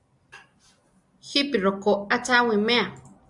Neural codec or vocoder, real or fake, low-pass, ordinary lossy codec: none; real; 10.8 kHz; Opus, 64 kbps